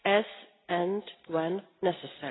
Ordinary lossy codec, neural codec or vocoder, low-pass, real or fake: AAC, 16 kbps; none; 7.2 kHz; real